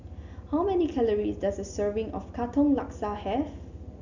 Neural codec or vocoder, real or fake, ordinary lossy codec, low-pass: none; real; none; 7.2 kHz